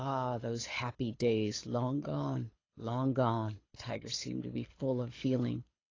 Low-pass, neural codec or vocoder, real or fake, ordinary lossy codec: 7.2 kHz; codec, 24 kHz, 6 kbps, HILCodec; fake; AAC, 32 kbps